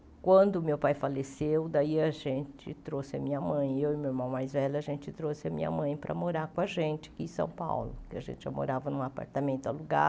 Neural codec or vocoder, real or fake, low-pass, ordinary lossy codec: none; real; none; none